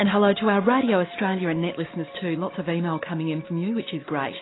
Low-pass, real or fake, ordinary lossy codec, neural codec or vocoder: 7.2 kHz; real; AAC, 16 kbps; none